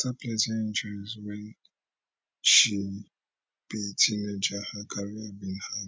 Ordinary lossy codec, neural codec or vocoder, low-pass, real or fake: none; none; none; real